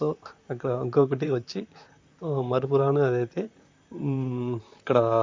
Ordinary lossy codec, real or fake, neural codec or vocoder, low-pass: MP3, 48 kbps; fake; vocoder, 44.1 kHz, 128 mel bands, Pupu-Vocoder; 7.2 kHz